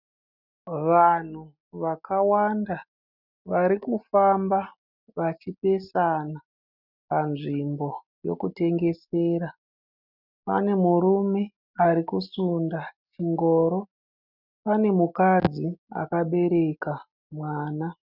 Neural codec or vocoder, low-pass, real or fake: none; 5.4 kHz; real